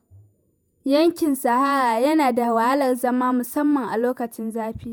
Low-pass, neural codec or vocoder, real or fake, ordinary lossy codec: none; vocoder, 48 kHz, 128 mel bands, Vocos; fake; none